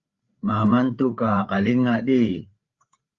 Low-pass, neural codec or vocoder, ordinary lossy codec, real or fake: 7.2 kHz; codec, 16 kHz, 4 kbps, FreqCodec, larger model; Opus, 32 kbps; fake